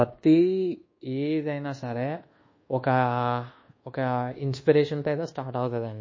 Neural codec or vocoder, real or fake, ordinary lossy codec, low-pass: codec, 24 kHz, 1.2 kbps, DualCodec; fake; MP3, 32 kbps; 7.2 kHz